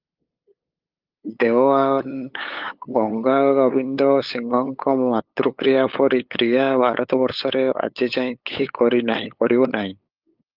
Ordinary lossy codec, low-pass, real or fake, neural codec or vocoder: Opus, 32 kbps; 5.4 kHz; fake; codec, 16 kHz, 8 kbps, FunCodec, trained on LibriTTS, 25 frames a second